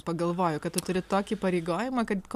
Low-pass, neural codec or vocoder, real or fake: 14.4 kHz; none; real